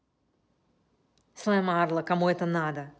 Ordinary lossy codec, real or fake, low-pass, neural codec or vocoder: none; real; none; none